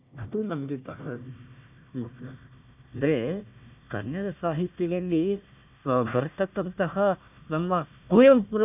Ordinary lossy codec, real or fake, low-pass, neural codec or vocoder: none; fake; 3.6 kHz; codec, 16 kHz, 1 kbps, FunCodec, trained on Chinese and English, 50 frames a second